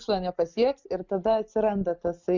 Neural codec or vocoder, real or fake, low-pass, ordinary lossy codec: none; real; 7.2 kHz; Opus, 64 kbps